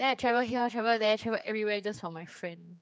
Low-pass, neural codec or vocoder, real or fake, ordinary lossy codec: none; codec, 16 kHz, 4 kbps, X-Codec, HuBERT features, trained on general audio; fake; none